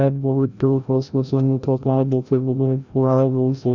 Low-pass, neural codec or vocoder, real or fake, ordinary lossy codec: 7.2 kHz; codec, 16 kHz, 0.5 kbps, FreqCodec, larger model; fake; Opus, 64 kbps